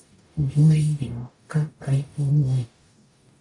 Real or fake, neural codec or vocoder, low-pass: fake; codec, 44.1 kHz, 0.9 kbps, DAC; 10.8 kHz